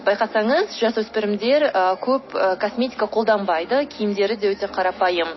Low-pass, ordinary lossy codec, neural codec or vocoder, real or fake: 7.2 kHz; MP3, 24 kbps; none; real